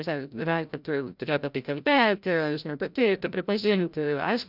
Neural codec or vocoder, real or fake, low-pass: codec, 16 kHz, 0.5 kbps, FreqCodec, larger model; fake; 5.4 kHz